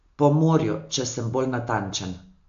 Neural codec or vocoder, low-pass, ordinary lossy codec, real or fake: none; 7.2 kHz; AAC, 64 kbps; real